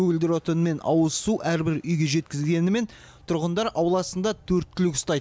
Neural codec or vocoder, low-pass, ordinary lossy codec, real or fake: none; none; none; real